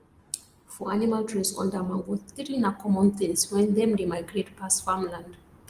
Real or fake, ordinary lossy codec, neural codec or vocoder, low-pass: real; Opus, 24 kbps; none; 14.4 kHz